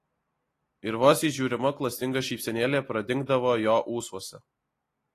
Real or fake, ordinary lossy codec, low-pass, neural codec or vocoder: real; AAC, 48 kbps; 14.4 kHz; none